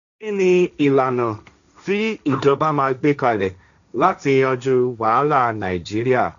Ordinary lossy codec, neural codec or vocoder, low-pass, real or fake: none; codec, 16 kHz, 1.1 kbps, Voila-Tokenizer; 7.2 kHz; fake